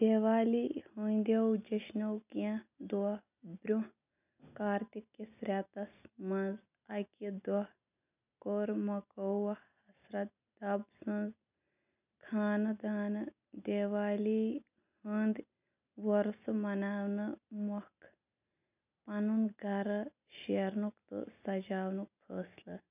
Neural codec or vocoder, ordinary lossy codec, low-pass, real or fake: none; none; 3.6 kHz; real